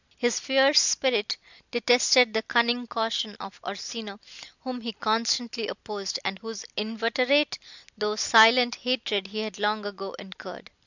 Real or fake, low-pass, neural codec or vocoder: real; 7.2 kHz; none